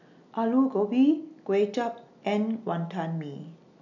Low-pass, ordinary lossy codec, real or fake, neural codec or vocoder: 7.2 kHz; none; real; none